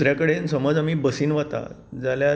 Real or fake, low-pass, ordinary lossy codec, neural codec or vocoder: real; none; none; none